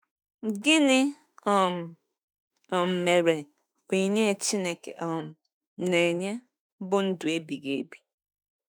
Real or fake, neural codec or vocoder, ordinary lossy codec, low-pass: fake; autoencoder, 48 kHz, 32 numbers a frame, DAC-VAE, trained on Japanese speech; none; none